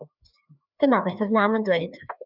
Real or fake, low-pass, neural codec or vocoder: fake; 5.4 kHz; codec, 16 kHz, 2 kbps, FreqCodec, larger model